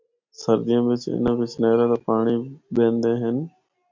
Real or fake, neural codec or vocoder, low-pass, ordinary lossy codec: real; none; 7.2 kHz; MP3, 64 kbps